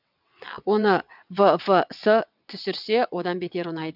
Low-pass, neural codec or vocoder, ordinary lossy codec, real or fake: 5.4 kHz; vocoder, 22.05 kHz, 80 mel bands, WaveNeXt; none; fake